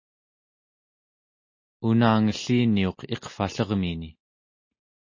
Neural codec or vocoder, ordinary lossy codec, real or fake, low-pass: none; MP3, 32 kbps; real; 7.2 kHz